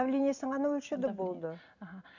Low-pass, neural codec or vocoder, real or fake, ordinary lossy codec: 7.2 kHz; none; real; none